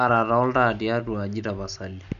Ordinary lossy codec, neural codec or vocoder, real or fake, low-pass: none; none; real; 7.2 kHz